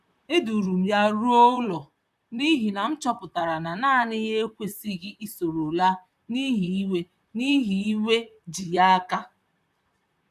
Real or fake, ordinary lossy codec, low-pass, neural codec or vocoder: fake; none; 14.4 kHz; vocoder, 44.1 kHz, 128 mel bands, Pupu-Vocoder